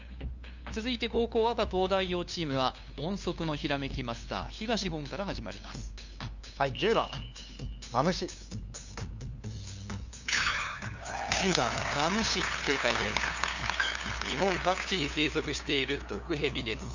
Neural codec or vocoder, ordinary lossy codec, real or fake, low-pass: codec, 16 kHz, 2 kbps, FunCodec, trained on LibriTTS, 25 frames a second; Opus, 64 kbps; fake; 7.2 kHz